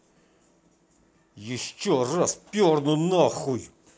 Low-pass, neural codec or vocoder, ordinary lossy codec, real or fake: none; none; none; real